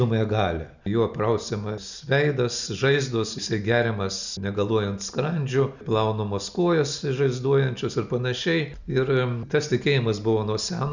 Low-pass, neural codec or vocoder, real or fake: 7.2 kHz; none; real